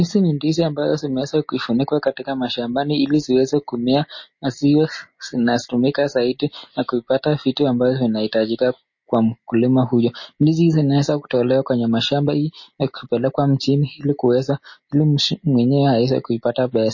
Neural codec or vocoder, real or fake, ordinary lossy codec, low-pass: none; real; MP3, 32 kbps; 7.2 kHz